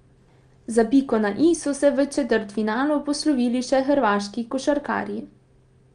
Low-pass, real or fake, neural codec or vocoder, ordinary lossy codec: 9.9 kHz; real; none; Opus, 32 kbps